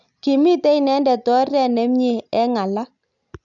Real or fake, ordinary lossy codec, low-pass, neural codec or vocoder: real; none; 7.2 kHz; none